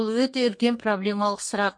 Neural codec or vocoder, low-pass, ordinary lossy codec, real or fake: codec, 32 kHz, 1.9 kbps, SNAC; 9.9 kHz; MP3, 48 kbps; fake